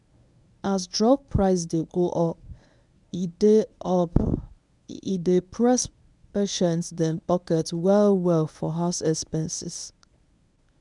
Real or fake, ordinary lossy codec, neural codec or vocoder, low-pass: fake; none; codec, 24 kHz, 0.9 kbps, WavTokenizer, medium speech release version 1; 10.8 kHz